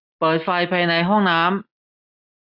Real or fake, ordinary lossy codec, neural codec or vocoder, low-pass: real; none; none; 5.4 kHz